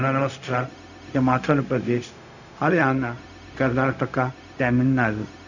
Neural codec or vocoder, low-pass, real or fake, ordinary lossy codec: codec, 16 kHz, 0.4 kbps, LongCat-Audio-Codec; 7.2 kHz; fake; none